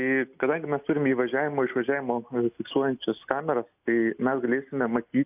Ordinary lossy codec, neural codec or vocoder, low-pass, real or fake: AAC, 32 kbps; none; 3.6 kHz; real